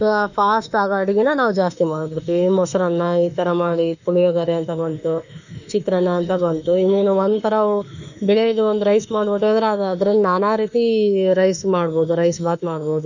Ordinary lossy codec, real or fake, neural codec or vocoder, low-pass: none; fake; autoencoder, 48 kHz, 32 numbers a frame, DAC-VAE, trained on Japanese speech; 7.2 kHz